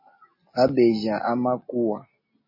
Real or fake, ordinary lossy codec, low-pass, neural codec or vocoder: real; MP3, 24 kbps; 5.4 kHz; none